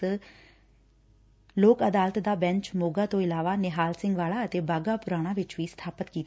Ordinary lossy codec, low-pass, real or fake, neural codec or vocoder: none; none; real; none